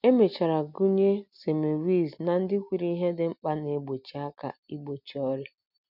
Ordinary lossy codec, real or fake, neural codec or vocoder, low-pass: none; real; none; 5.4 kHz